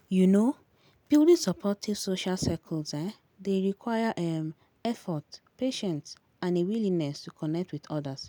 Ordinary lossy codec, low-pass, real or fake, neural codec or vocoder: none; none; real; none